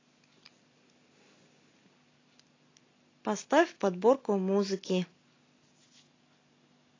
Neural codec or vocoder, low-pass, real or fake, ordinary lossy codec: none; 7.2 kHz; real; AAC, 32 kbps